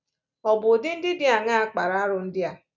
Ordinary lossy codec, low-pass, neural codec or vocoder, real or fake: none; 7.2 kHz; none; real